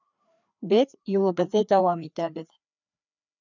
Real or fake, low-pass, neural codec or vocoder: fake; 7.2 kHz; codec, 16 kHz, 2 kbps, FreqCodec, larger model